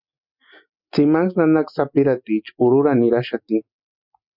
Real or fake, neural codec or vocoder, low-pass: real; none; 5.4 kHz